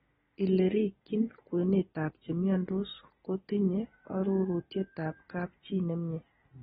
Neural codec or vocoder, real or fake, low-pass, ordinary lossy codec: none; real; 19.8 kHz; AAC, 16 kbps